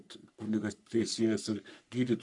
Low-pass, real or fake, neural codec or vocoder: 10.8 kHz; fake; codec, 44.1 kHz, 3.4 kbps, Pupu-Codec